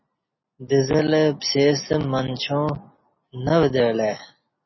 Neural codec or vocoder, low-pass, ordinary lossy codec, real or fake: none; 7.2 kHz; MP3, 24 kbps; real